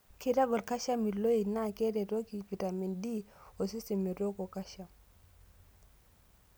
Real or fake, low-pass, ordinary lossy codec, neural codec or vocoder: real; none; none; none